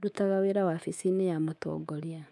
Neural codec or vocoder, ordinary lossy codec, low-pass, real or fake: codec, 24 kHz, 3.1 kbps, DualCodec; none; none; fake